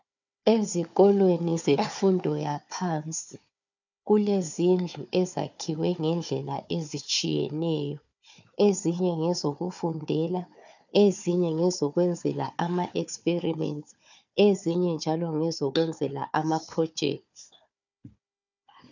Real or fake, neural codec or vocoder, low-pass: fake; codec, 16 kHz, 4 kbps, FunCodec, trained on Chinese and English, 50 frames a second; 7.2 kHz